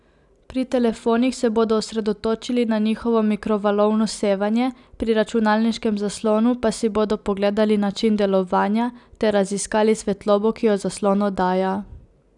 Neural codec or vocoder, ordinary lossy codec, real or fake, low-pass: none; none; real; 10.8 kHz